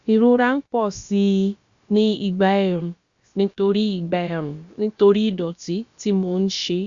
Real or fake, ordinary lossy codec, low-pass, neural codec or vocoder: fake; Opus, 64 kbps; 7.2 kHz; codec, 16 kHz, about 1 kbps, DyCAST, with the encoder's durations